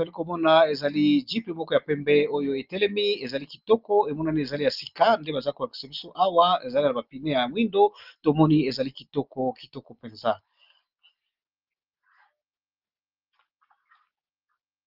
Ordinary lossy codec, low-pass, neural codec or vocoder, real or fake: Opus, 32 kbps; 5.4 kHz; none; real